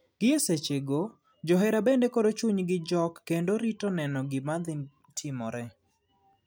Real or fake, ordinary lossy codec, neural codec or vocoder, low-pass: real; none; none; none